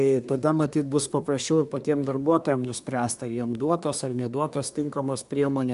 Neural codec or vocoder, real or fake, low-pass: codec, 24 kHz, 1 kbps, SNAC; fake; 10.8 kHz